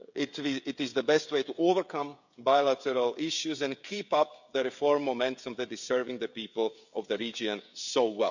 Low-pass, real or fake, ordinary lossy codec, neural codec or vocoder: 7.2 kHz; fake; none; codec, 16 kHz, 16 kbps, FreqCodec, smaller model